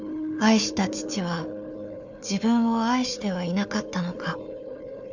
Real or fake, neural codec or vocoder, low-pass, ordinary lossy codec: fake; codec, 16 kHz, 4 kbps, FunCodec, trained on Chinese and English, 50 frames a second; 7.2 kHz; none